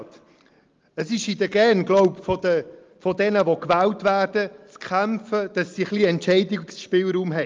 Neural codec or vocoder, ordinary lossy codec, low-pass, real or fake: none; Opus, 24 kbps; 7.2 kHz; real